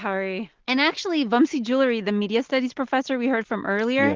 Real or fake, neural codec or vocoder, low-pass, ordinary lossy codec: real; none; 7.2 kHz; Opus, 16 kbps